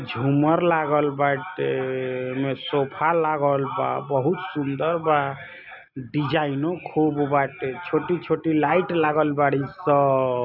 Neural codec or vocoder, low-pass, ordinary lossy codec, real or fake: none; 5.4 kHz; none; real